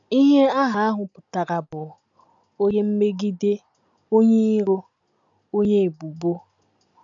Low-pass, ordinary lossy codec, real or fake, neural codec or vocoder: 7.2 kHz; none; real; none